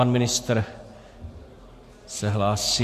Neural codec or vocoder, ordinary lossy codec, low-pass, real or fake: none; MP3, 64 kbps; 14.4 kHz; real